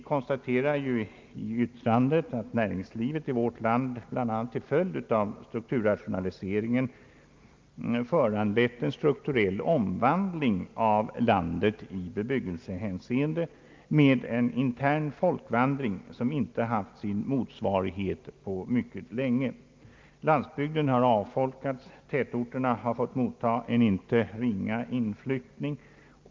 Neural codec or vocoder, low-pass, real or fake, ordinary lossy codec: none; 7.2 kHz; real; Opus, 24 kbps